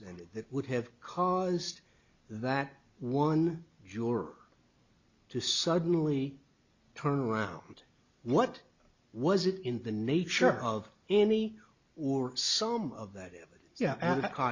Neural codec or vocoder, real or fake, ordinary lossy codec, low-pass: none; real; Opus, 64 kbps; 7.2 kHz